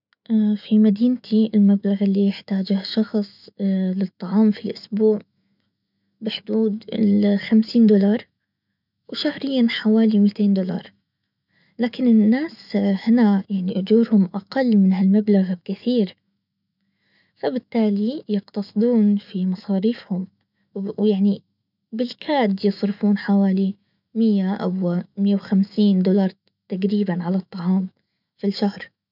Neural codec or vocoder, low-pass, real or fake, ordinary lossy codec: autoencoder, 48 kHz, 128 numbers a frame, DAC-VAE, trained on Japanese speech; 5.4 kHz; fake; none